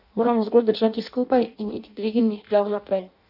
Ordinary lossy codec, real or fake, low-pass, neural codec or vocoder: none; fake; 5.4 kHz; codec, 16 kHz in and 24 kHz out, 0.6 kbps, FireRedTTS-2 codec